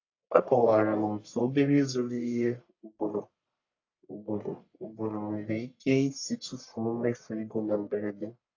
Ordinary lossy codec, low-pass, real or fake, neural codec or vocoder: AAC, 48 kbps; 7.2 kHz; fake; codec, 44.1 kHz, 1.7 kbps, Pupu-Codec